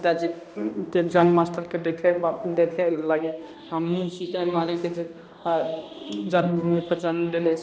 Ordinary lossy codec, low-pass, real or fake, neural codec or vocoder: none; none; fake; codec, 16 kHz, 1 kbps, X-Codec, HuBERT features, trained on balanced general audio